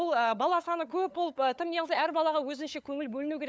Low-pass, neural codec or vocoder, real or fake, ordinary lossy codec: none; codec, 16 kHz, 8 kbps, FunCodec, trained on LibriTTS, 25 frames a second; fake; none